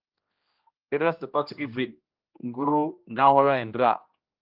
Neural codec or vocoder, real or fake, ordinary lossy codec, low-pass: codec, 16 kHz, 1 kbps, X-Codec, HuBERT features, trained on general audio; fake; Opus, 24 kbps; 5.4 kHz